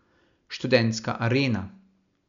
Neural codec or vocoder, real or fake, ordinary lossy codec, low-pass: none; real; none; 7.2 kHz